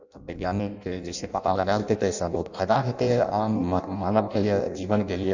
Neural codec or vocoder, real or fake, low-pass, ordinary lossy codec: codec, 16 kHz in and 24 kHz out, 0.6 kbps, FireRedTTS-2 codec; fake; 7.2 kHz; none